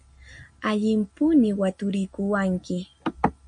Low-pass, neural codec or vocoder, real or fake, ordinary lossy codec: 9.9 kHz; none; real; MP3, 48 kbps